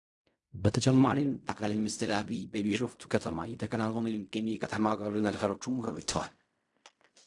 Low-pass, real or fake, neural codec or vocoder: 10.8 kHz; fake; codec, 16 kHz in and 24 kHz out, 0.4 kbps, LongCat-Audio-Codec, fine tuned four codebook decoder